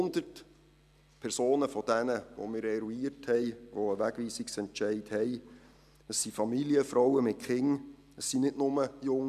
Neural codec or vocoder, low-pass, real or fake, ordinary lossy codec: none; 14.4 kHz; real; none